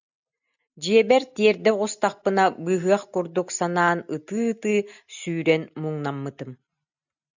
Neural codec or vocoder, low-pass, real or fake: none; 7.2 kHz; real